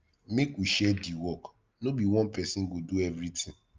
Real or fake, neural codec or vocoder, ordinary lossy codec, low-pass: real; none; Opus, 24 kbps; 7.2 kHz